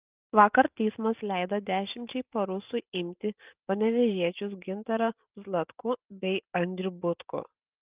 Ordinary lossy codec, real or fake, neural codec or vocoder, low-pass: Opus, 24 kbps; real; none; 3.6 kHz